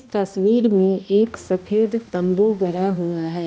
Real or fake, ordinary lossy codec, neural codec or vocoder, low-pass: fake; none; codec, 16 kHz, 1 kbps, X-Codec, HuBERT features, trained on balanced general audio; none